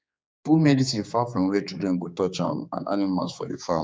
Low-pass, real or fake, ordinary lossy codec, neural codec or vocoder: none; fake; none; codec, 16 kHz, 4 kbps, X-Codec, HuBERT features, trained on general audio